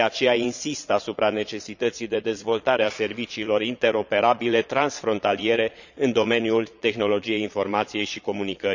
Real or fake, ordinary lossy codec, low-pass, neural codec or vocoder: fake; none; 7.2 kHz; vocoder, 22.05 kHz, 80 mel bands, Vocos